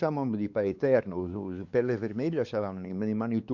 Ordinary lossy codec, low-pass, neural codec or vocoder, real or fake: Opus, 64 kbps; 7.2 kHz; codec, 16 kHz, 4 kbps, X-Codec, WavLM features, trained on Multilingual LibriSpeech; fake